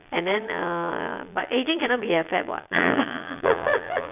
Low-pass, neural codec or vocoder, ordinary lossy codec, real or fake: 3.6 kHz; vocoder, 22.05 kHz, 80 mel bands, Vocos; none; fake